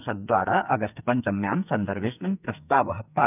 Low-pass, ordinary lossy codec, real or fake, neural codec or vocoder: 3.6 kHz; none; fake; codec, 44.1 kHz, 2.6 kbps, SNAC